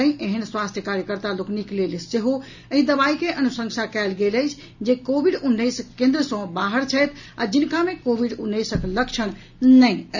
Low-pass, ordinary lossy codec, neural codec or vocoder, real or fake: 7.2 kHz; none; none; real